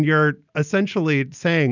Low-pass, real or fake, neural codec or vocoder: 7.2 kHz; real; none